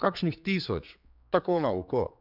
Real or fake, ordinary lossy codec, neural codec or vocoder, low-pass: fake; none; codec, 16 kHz, 4 kbps, X-Codec, HuBERT features, trained on general audio; 5.4 kHz